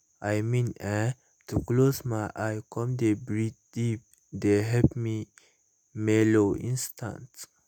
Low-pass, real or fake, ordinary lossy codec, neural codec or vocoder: none; real; none; none